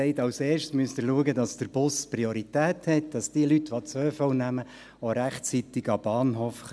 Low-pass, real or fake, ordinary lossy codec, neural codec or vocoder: none; real; none; none